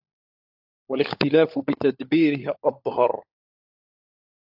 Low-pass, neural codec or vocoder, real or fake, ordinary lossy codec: 5.4 kHz; codec, 16 kHz, 16 kbps, FunCodec, trained on LibriTTS, 50 frames a second; fake; AAC, 48 kbps